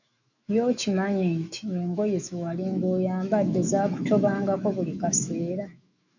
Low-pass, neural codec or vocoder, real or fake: 7.2 kHz; autoencoder, 48 kHz, 128 numbers a frame, DAC-VAE, trained on Japanese speech; fake